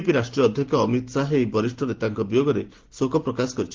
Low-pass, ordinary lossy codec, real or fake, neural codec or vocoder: 7.2 kHz; Opus, 16 kbps; real; none